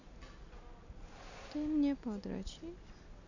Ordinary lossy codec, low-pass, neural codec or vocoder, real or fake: none; 7.2 kHz; none; real